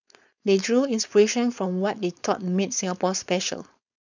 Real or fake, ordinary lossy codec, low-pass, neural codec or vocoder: fake; none; 7.2 kHz; codec, 16 kHz, 4.8 kbps, FACodec